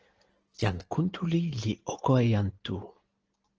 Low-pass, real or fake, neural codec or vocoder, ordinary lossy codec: 7.2 kHz; real; none; Opus, 16 kbps